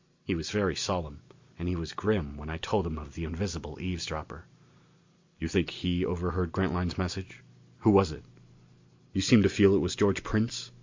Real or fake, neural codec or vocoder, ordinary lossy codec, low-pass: real; none; MP3, 48 kbps; 7.2 kHz